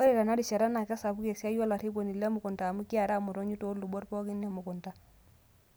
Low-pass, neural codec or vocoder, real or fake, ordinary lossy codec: none; none; real; none